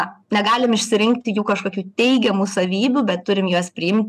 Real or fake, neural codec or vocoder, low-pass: real; none; 14.4 kHz